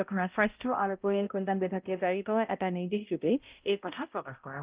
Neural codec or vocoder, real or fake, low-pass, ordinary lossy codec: codec, 16 kHz, 0.5 kbps, X-Codec, HuBERT features, trained on balanced general audio; fake; 3.6 kHz; Opus, 24 kbps